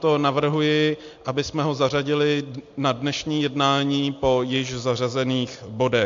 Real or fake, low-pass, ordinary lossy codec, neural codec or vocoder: real; 7.2 kHz; MP3, 48 kbps; none